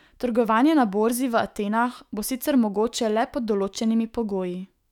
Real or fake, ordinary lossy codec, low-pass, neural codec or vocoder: fake; none; 19.8 kHz; autoencoder, 48 kHz, 128 numbers a frame, DAC-VAE, trained on Japanese speech